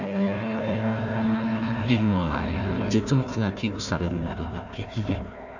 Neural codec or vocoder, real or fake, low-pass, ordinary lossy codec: codec, 16 kHz, 1 kbps, FunCodec, trained on Chinese and English, 50 frames a second; fake; 7.2 kHz; none